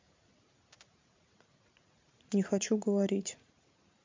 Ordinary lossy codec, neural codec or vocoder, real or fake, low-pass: MP3, 48 kbps; vocoder, 44.1 kHz, 80 mel bands, Vocos; fake; 7.2 kHz